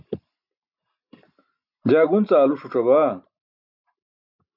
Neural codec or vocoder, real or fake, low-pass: none; real; 5.4 kHz